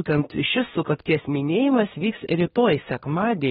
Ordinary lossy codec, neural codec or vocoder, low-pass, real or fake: AAC, 16 kbps; codec, 24 kHz, 1 kbps, SNAC; 10.8 kHz; fake